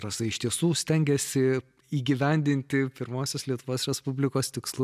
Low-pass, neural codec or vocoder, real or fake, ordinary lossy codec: 14.4 kHz; none; real; MP3, 96 kbps